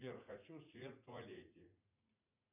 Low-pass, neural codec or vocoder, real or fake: 3.6 kHz; vocoder, 44.1 kHz, 80 mel bands, Vocos; fake